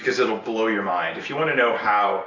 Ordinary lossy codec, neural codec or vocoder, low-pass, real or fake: AAC, 32 kbps; none; 7.2 kHz; real